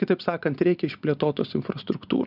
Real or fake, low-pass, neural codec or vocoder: real; 5.4 kHz; none